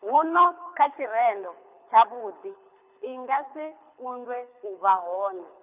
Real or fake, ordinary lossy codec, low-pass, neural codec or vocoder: fake; none; 3.6 kHz; codec, 24 kHz, 6 kbps, HILCodec